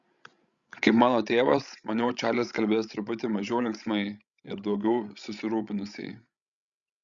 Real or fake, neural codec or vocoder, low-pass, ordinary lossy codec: fake; codec, 16 kHz, 16 kbps, FreqCodec, larger model; 7.2 kHz; Opus, 64 kbps